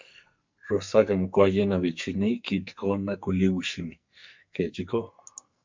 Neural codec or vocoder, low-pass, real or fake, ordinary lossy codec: codec, 44.1 kHz, 2.6 kbps, SNAC; 7.2 kHz; fake; MP3, 64 kbps